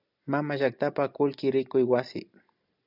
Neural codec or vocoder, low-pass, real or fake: none; 5.4 kHz; real